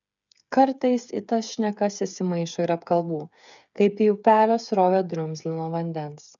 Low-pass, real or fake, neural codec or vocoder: 7.2 kHz; fake; codec, 16 kHz, 8 kbps, FreqCodec, smaller model